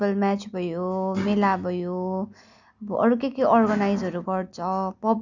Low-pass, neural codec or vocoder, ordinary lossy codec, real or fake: 7.2 kHz; none; none; real